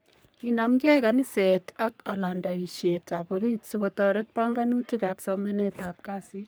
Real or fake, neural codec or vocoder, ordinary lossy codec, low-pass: fake; codec, 44.1 kHz, 3.4 kbps, Pupu-Codec; none; none